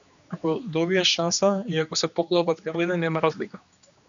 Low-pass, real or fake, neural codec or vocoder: 7.2 kHz; fake; codec, 16 kHz, 2 kbps, X-Codec, HuBERT features, trained on general audio